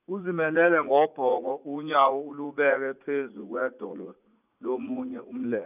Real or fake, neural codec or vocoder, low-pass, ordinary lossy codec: fake; vocoder, 44.1 kHz, 80 mel bands, Vocos; 3.6 kHz; none